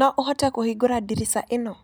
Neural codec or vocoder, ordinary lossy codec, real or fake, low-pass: none; none; real; none